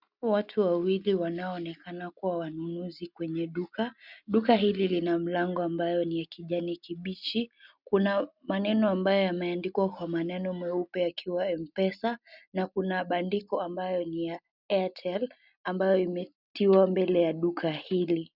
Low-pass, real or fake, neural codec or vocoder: 5.4 kHz; real; none